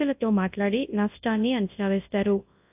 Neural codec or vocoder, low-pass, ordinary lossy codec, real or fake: codec, 24 kHz, 0.9 kbps, WavTokenizer, large speech release; 3.6 kHz; AAC, 32 kbps; fake